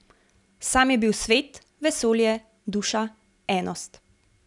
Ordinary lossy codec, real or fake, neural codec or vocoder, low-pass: none; real; none; 10.8 kHz